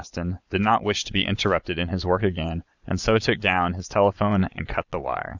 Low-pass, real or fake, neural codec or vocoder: 7.2 kHz; fake; vocoder, 22.05 kHz, 80 mel bands, Vocos